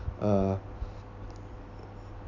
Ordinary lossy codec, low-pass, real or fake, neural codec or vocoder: none; 7.2 kHz; real; none